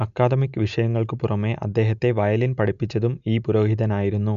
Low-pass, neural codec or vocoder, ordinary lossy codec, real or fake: 7.2 kHz; none; none; real